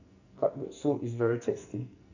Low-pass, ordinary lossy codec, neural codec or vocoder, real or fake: 7.2 kHz; none; codec, 44.1 kHz, 2.6 kbps, SNAC; fake